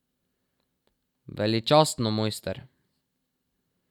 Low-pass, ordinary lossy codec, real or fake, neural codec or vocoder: 19.8 kHz; none; fake; vocoder, 44.1 kHz, 128 mel bands every 256 samples, BigVGAN v2